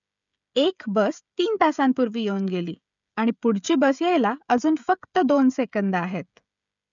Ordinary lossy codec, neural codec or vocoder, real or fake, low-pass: none; codec, 16 kHz, 16 kbps, FreqCodec, smaller model; fake; 7.2 kHz